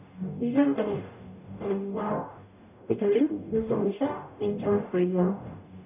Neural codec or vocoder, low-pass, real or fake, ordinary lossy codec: codec, 44.1 kHz, 0.9 kbps, DAC; 3.6 kHz; fake; none